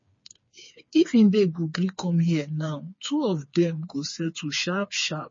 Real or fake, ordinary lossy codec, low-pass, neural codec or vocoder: fake; MP3, 32 kbps; 7.2 kHz; codec, 16 kHz, 4 kbps, FreqCodec, smaller model